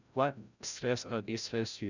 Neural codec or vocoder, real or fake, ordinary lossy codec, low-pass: codec, 16 kHz, 0.5 kbps, FreqCodec, larger model; fake; none; 7.2 kHz